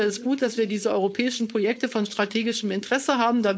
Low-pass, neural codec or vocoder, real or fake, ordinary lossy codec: none; codec, 16 kHz, 4.8 kbps, FACodec; fake; none